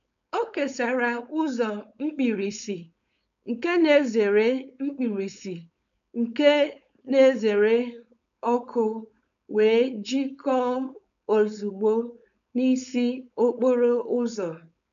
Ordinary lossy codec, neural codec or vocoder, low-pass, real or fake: none; codec, 16 kHz, 4.8 kbps, FACodec; 7.2 kHz; fake